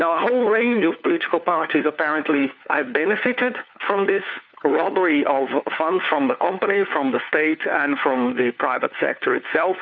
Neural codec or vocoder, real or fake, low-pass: codec, 16 kHz, 4 kbps, FunCodec, trained on LibriTTS, 50 frames a second; fake; 7.2 kHz